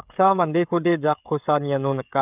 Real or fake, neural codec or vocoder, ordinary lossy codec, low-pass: fake; codec, 16 kHz, 16 kbps, FreqCodec, smaller model; none; 3.6 kHz